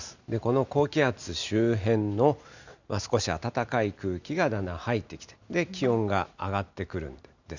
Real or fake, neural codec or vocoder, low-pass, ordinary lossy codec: real; none; 7.2 kHz; none